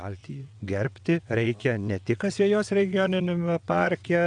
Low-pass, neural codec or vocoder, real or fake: 9.9 kHz; vocoder, 22.05 kHz, 80 mel bands, WaveNeXt; fake